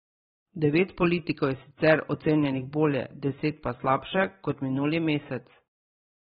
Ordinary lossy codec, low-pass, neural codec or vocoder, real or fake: AAC, 16 kbps; 19.8 kHz; vocoder, 44.1 kHz, 128 mel bands every 512 samples, BigVGAN v2; fake